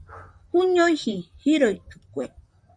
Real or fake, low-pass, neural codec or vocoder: fake; 9.9 kHz; vocoder, 44.1 kHz, 128 mel bands, Pupu-Vocoder